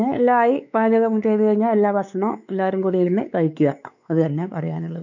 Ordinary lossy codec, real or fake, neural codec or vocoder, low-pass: none; fake; codec, 16 kHz, 4 kbps, X-Codec, WavLM features, trained on Multilingual LibriSpeech; 7.2 kHz